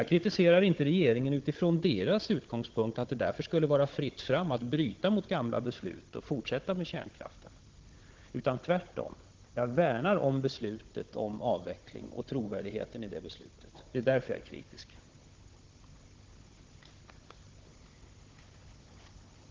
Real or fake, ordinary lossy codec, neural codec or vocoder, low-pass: fake; Opus, 16 kbps; codec, 16 kHz, 16 kbps, FunCodec, trained on Chinese and English, 50 frames a second; 7.2 kHz